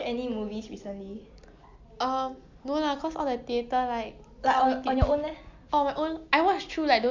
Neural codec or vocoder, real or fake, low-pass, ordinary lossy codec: none; real; 7.2 kHz; MP3, 64 kbps